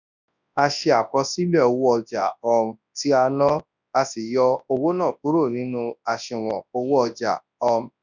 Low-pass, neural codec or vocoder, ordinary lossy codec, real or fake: 7.2 kHz; codec, 24 kHz, 0.9 kbps, WavTokenizer, large speech release; none; fake